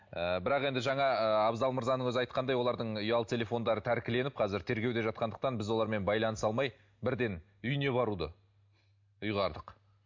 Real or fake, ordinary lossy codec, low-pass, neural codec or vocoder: real; MP3, 48 kbps; 7.2 kHz; none